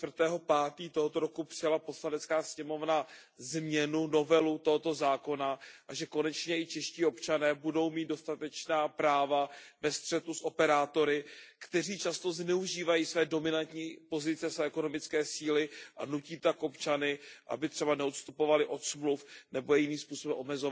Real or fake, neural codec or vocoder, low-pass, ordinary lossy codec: real; none; none; none